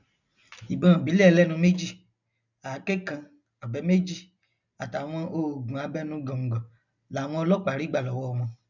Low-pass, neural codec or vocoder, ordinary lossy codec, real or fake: 7.2 kHz; none; none; real